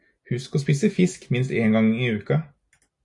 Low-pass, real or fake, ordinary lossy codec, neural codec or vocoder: 10.8 kHz; real; AAC, 64 kbps; none